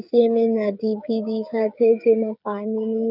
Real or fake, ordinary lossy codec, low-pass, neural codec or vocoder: fake; none; 5.4 kHz; codec, 16 kHz, 8 kbps, FreqCodec, smaller model